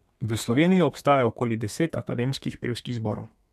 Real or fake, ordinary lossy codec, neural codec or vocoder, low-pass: fake; none; codec, 32 kHz, 1.9 kbps, SNAC; 14.4 kHz